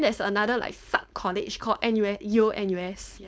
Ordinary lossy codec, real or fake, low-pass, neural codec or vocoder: none; fake; none; codec, 16 kHz, 4.8 kbps, FACodec